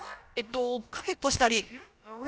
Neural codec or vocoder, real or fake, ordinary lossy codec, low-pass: codec, 16 kHz, about 1 kbps, DyCAST, with the encoder's durations; fake; none; none